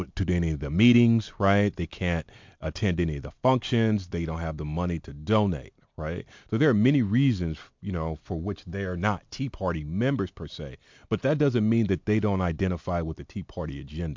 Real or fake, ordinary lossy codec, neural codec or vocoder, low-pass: real; MP3, 64 kbps; none; 7.2 kHz